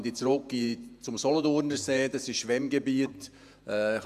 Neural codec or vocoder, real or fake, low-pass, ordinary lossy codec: vocoder, 48 kHz, 128 mel bands, Vocos; fake; 14.4 kHz; none